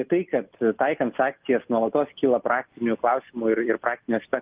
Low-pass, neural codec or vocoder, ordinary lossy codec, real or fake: 3.6 kHz; none; Opus, 32 kbps; real